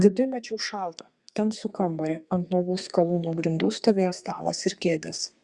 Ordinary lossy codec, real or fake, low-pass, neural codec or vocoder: Opus, 64 kbps; fake; 10.8 kHz; codec, 32 kHz, 1.9 kbps, SNAC